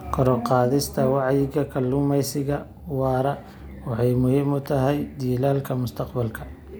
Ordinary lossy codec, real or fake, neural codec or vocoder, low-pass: none; real; none; none